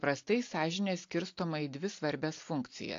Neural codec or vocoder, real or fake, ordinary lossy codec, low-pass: none; real; AAC, 48 kbps; 7.2 kHz